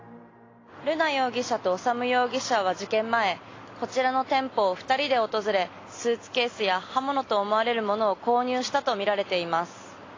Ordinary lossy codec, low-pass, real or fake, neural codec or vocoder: AAC, 32 kbps; 7.2 kHz; real; none